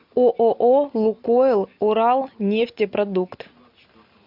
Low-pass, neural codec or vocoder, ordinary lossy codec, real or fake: 5.4 kHz; none; MP3, 48 kbps; real